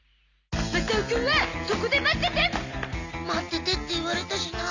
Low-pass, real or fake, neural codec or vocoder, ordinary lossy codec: 7.2 kHz; real; none; none